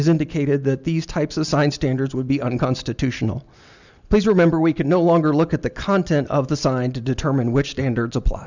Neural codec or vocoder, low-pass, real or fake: none; 7.2 kHz; real